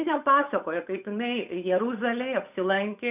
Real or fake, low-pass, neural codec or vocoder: fake; 3.6 kHz; codec, 16 kHz, 8 kbps, FunCodec, trained on Chinese and English, 25 frames a second